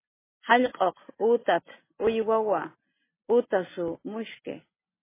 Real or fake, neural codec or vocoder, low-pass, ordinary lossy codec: real; none; 3.6 kHz; MP3, 16 kbps